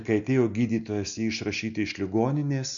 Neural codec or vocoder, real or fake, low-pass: none; real; 7.2 kHz